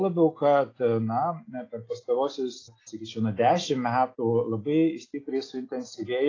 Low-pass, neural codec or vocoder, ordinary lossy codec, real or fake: 7.2 kHz; none; AAC, 32 kbps; real